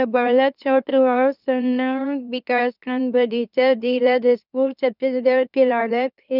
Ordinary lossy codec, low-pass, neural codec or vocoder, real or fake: none; 5.4 kHz; autoencoder, 44.1 kHz, a latent of 192 numbers a frame, MeloTTS; fake